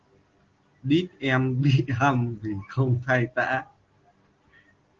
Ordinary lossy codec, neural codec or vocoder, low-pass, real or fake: Opus, 16 kbps; none; 7.2 kHz; real